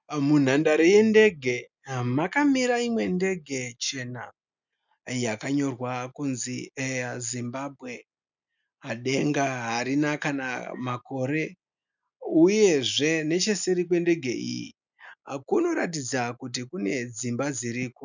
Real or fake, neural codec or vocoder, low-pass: real; none; 7.2 kHz